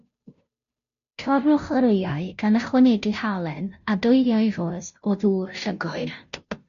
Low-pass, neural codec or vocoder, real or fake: 7.2 kHz; codec, 16 kHz, 0.5 kbps, FunCodec, trained on Chinese and English, 25 frames a second; fake